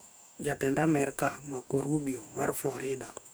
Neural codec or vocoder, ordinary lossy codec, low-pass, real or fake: codec, 44.1 kHz, 2.6 kbps, DAC; none; none; fake